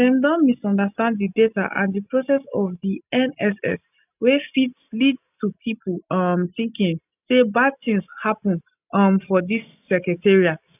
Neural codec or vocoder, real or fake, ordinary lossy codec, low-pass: none; real; none; 3.6 kHz